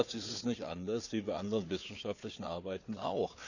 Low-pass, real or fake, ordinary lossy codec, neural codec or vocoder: 7.2 kHz; fake; none; codec, 16 kHz, 4 kbps, FunCodec, trained on Chinese and English, 50 frames a second